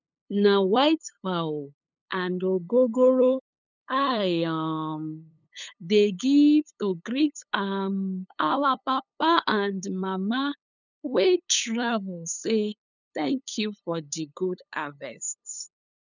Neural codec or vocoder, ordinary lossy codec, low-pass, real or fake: codec, 16 kHz, 8 kbps, FunCodec, trained on LibriTTS, 25 frames a second; none; 7.2 kHz; fake